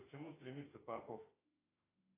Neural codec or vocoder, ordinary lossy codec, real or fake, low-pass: autoencoder, 48 kHz, 32 numbers a frame, DAC-VAE, trained on Japanese speech; AAC, 24 kbps; fake; 3.6 kHz